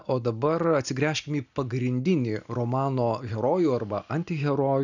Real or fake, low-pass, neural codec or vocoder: real; 7.2 kHz; none